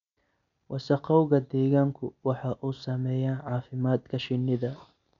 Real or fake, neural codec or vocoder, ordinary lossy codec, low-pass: real; none; none; 7.2 kHz